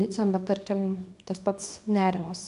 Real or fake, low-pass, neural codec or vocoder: fake; 10.8 kHz; codec, 24 kHz, 0.9 kbps, WavTokenizer, small release